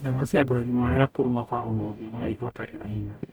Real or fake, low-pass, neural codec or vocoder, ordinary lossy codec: fake; none; codec, 44.1 kHz, 0.9 kbps, DAC; none